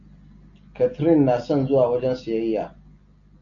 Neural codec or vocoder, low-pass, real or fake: none; 7.2 kHz; real